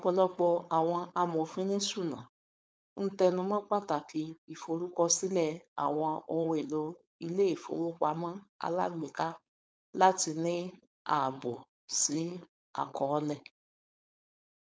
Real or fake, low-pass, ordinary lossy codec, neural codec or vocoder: fake; none; none; codec, 16 kHz, 4.8 kbps, FACodec